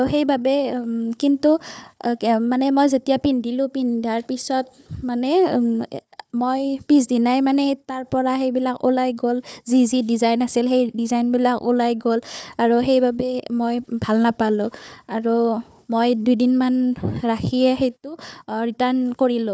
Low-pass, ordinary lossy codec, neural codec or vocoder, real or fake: none; none; codec, 16 kHz, 4 kbps, FunCodec, trained on Chinese and English, 50 frames a second; fake